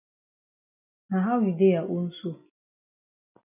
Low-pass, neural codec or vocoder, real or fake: 3.6 kHz; none; real